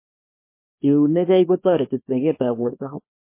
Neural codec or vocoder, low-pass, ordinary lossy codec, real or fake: codec, 24 kHz, 0.9 kbps, WavTokenizer, small release; 3.6 kHz; MP3, 24 kbps; fake